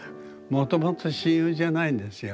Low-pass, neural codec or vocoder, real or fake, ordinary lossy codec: none; none; real; none